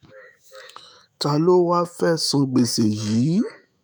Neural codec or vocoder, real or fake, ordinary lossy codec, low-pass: autoencoder, 48 kHz, 128 numbers a frame, DAC-VAE, trained on Japanese speech; fake; none; none